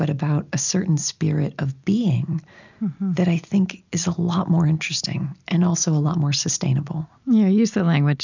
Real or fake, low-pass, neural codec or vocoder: real; 7.2 kHz; none